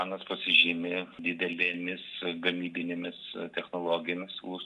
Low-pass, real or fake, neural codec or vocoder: 10.8 kHz; real; none